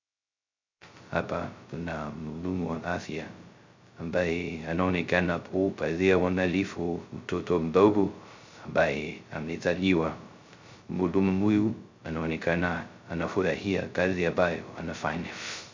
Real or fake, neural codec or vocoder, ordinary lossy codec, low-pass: fake; codec, 16 kHz, 0.2 kbps, FocalCodec; MP3, 64 kbps; 7.2 kHz